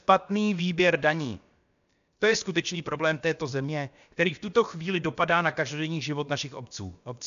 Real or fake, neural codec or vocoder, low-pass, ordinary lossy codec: fake; codec, 16 kHz, about 1 kbps, DyCAST, with the encoder's durations; 7.2 kHz; AAC, 96 kbps